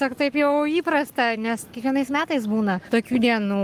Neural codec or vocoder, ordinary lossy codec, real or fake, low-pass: codec, 44.1 kHz, 7.8 kbps, Pupu-Codec; Opus, 32 kbps; fake; 14.4 kHz